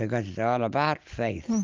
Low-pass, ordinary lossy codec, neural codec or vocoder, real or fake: 7.2 kHz; Opus, 24 kbps; none; real